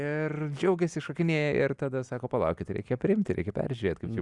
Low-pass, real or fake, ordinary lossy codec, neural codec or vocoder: 10.8 kHz; real; Opus, 64 kbps; none